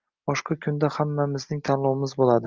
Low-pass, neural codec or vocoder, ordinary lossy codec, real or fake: 7.2 kHz; none; Opus, 32 kbps; real